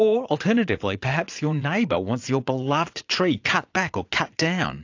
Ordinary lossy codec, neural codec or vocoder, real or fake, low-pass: AAC, 48 kbps; vocoder, 22.05 kHz, 80 mel bands, Vocos; fake; 7.2 kHz